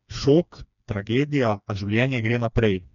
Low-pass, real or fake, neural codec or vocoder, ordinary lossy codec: 7.2 kHz; fake; codec, 16 kHz, 2 kbps, FreqCodec, smaller model; none